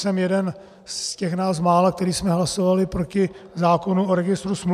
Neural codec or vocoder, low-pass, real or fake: none; 14.4 kHz; real